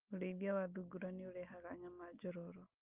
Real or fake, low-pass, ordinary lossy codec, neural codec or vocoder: real; 3.6 kHz; Opus, 32 kbps; none